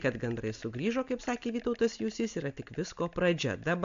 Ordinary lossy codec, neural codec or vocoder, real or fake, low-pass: MP3, 64 kbps; none; real; 7.2 kHz